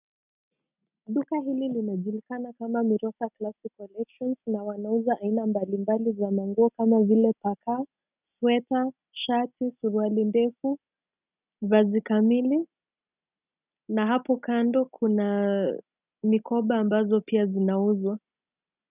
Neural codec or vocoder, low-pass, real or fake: none; 3.6 kHz; real